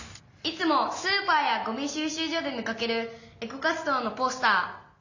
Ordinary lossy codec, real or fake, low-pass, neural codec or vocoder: none; real; 7.2 kHz; none